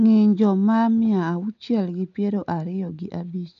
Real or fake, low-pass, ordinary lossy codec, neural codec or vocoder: real; 7.2 kHz; none; none